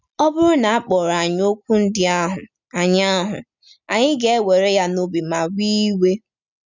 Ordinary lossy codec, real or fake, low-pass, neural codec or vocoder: none; real; 7.2 kHz; none